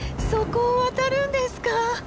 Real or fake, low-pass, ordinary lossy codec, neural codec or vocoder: real; none; none; none